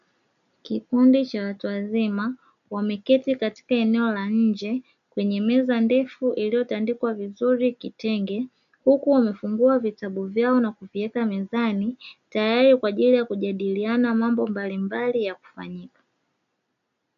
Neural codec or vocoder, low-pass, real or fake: none; 7.2 kHz; real